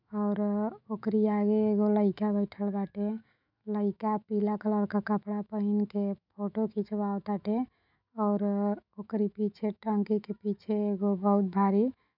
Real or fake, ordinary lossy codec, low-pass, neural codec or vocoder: fake; none; 5.4 kHz; autoencoder, 48 kHz, 128 numbers a frame, DAC-VAE, trained on Japanese speech